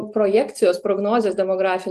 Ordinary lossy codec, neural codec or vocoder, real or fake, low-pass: Opus, 24 kbps; none; real; 14.4 kHz